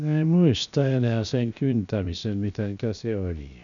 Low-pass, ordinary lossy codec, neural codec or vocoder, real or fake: 7.2 kHz; none; codec, 16 kHz, 0.7 kbps, FocalCodec; fake